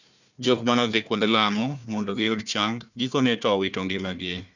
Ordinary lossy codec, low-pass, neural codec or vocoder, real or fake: none; 7.2 kHz; codec, 16 kHz, 1 kbps, FunCodec, trained on Chinese and English, 50 frames a second; fake